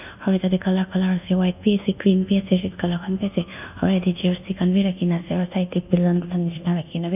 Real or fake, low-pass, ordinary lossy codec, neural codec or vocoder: fake; 3.6 kHz; none; codec, 24 kHz, 1.2 kbps, DualCodec